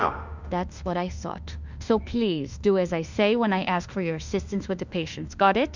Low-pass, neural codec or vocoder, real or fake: 7.2 kHz; autoencoder, 48 kHz, 32 numbers a frame, DAC-VAE, trained on Japanese speech; fake